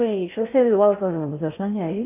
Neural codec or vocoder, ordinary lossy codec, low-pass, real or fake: codec, 16 kHz in and 24 kHz out, 0.6 kbps, FocalCodec, streaming, 4096 codes; AAC, 32 kbps; 3.6 kHz; fake